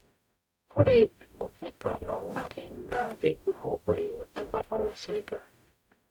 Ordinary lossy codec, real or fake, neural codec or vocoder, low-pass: none; fake; codec, 44.1 kHz, 0.9 kbps, DAC; 19.8 kHz